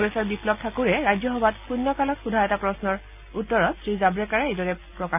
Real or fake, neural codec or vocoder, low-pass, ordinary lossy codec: real; none; 3.6 kHz; none